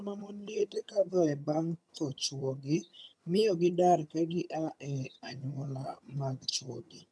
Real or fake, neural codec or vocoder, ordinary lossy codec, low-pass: fake; vocoder, 22.05 kHz, 80 mel bands, HiFi-GAN; none; none